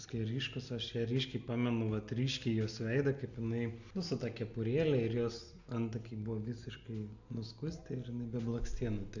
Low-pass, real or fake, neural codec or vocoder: 7.2 kHz; real; none